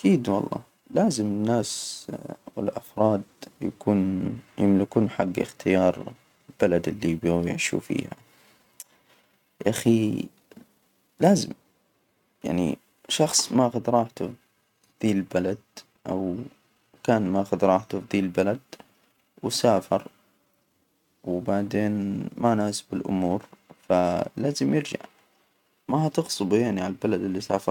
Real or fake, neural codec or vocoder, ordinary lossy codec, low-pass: real; none; none; 19.8 kHz